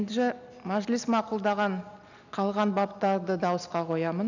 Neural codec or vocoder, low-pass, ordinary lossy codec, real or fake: none; 7.2 kHz; none; real